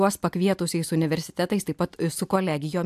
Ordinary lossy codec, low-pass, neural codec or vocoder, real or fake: AAC, 96 kbps; 14.4 kHz; none; real